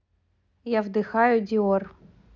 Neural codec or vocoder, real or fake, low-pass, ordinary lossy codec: none; real; 7.2 kHz; none